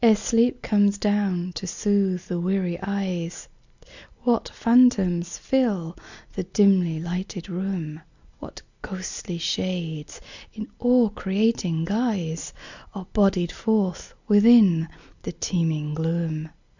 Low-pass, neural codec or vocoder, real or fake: 7.2 kHz; none; real